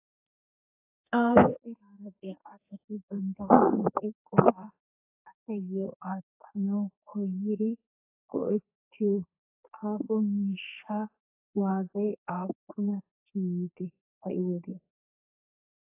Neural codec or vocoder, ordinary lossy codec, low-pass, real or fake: codec, 32 kHz, 1.9 kbps, SNAC; AAC, 24 kbps; 3.6 kHz; fake